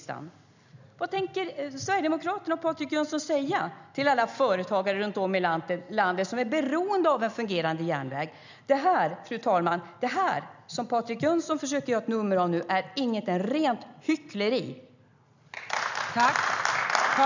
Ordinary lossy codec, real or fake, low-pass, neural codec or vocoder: none; real; 7.2 kHz; none